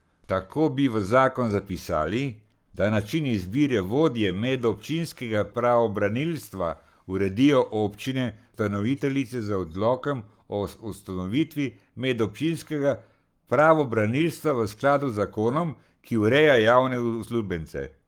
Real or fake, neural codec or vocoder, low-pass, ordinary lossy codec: fake; codec, 44.1 kHz, 7.8 kbps, Pupu-Codec; 19.8 kHz; Opus, 32 kbps